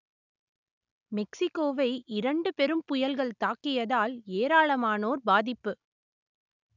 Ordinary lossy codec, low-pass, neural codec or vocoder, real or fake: none; 7.2 kHz; none; real